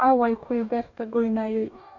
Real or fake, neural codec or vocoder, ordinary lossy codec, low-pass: fake; codec, 44.1 kHz, 2.6 kbps, DAC; none; 7.2 kHz